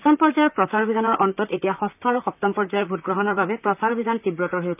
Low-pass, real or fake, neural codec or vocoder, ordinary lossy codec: 3.6 kHz; fake; vocoder, 44.1 kHz, 128 mel bands, Pupu-Vocoder; none